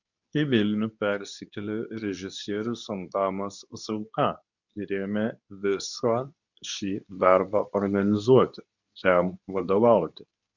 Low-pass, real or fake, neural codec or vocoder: 7.2 kHz; fake; codec, 24 kHz, 0.9 kbps, WavTokenizer, medium speech release version 2